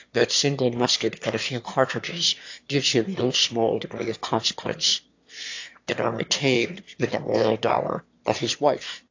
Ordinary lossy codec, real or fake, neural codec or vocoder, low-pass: AAC, 48 kbps; fake; autoencoder, 22.05 kHz, a latent of 192 numbers a frame, VITS, trained on one speaker; 7.2 kHz